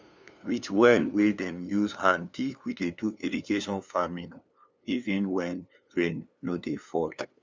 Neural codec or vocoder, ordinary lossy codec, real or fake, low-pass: codec, 16 kHz, 2 kbps, FunCodec, trained on LibriTTS, 25 frames a second; none; fake; none